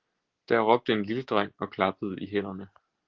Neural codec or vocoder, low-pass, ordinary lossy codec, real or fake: none; 7.2 kHz; Opus, 16 kbps; real